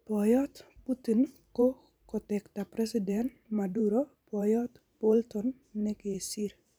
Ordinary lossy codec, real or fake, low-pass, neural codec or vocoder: none; fake; none; vocoder, 44.1 kHz, 128 mel bands every 512 samples, BigVGAN v2